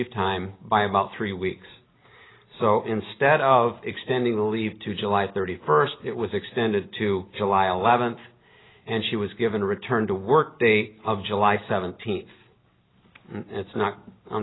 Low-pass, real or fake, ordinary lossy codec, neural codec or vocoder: 7.2 kHz; real; AAC, 16 kbps; none